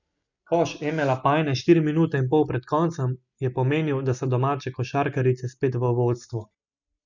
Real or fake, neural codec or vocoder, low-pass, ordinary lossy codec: real; none; 7.2 kHz; none